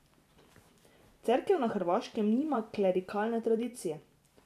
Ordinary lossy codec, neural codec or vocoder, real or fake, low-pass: none; vocoder, 44.1 kHz, 128 mel bands every 512 samples, BigVGAN v2; fake; 14.4 kHz